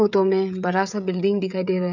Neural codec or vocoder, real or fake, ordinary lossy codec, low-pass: codec, 16 kHz, 16 kbps, FreqCodec, smaller model; fake; none; 7.2 kHz